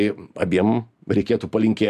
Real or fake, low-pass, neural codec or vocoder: fake; 14.4 kHz; vocoder, 44.1 kHz, 128 mel bands every 256 samples, BigVGAN v2